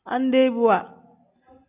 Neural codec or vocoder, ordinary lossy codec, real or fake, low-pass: none; AAC, 32 kbps; real; 3.6 kHz